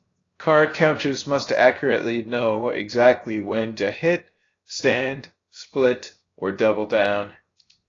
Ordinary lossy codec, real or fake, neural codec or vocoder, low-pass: AAC, 32 kbps; fake; codec, 16 kHz, 0.7 kbps, FocalCodec; 7.2 kHz